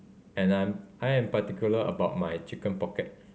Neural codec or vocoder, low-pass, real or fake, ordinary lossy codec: none; none; real; none